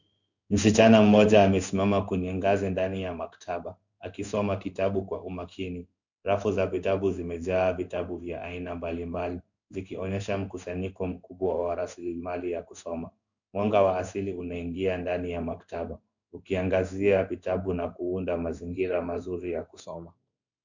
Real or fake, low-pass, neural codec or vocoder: fake; 7.2 kHz; codec, 16 kHz in and 24 kHz out, 1 kbps, XY-Tokenizer